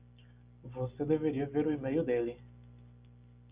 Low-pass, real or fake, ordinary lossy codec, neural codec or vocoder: 3.6 kHz; real; Opus, 64 kbps; none